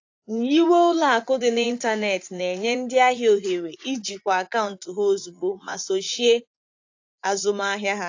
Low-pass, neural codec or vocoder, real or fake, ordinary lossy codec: 7.2 kHz; vocoder, 44.1 kHz, 80 mel bands, Vocos; fake; AAC, 48 kbps